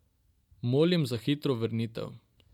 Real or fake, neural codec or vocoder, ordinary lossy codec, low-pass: real; none; none; 19.8 kHz